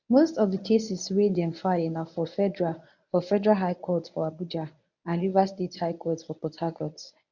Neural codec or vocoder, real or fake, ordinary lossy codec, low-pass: codec, 24 kHz, 0.9 kbps, WavTokenizer, medium speech release version 1; fake; none; 7.2 kHz